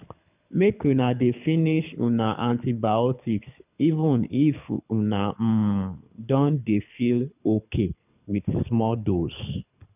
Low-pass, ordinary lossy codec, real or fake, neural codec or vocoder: 3.6 kHz; none; fake; codec, 24 kHz, 6 kbps, HILCodec